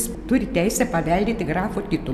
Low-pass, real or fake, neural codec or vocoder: 14.4 kHz; real; none